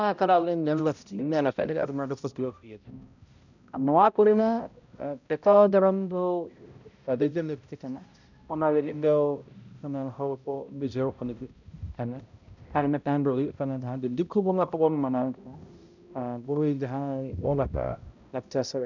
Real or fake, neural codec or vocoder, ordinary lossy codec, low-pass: fake; codec, 16 kHz, 0.5 kbps, X-Codec, HuBERT features, trained on balanced general audio; none; 7.2 kHz